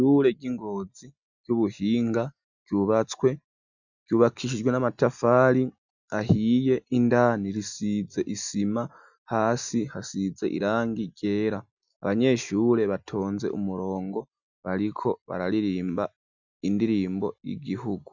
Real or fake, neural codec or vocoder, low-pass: real; none; 7.2 kHz